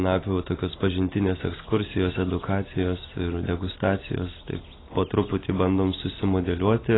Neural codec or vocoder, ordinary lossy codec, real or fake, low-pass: none; AAC, 16 kbps; real; 7.2 kHz